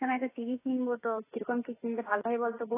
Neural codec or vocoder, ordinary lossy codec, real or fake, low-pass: autoencoder, 48 kHz, 32 numbers a frame, DAC-VAE, trained on Japanese speech; AAC, 16 kbps; fake; 3.6 kHz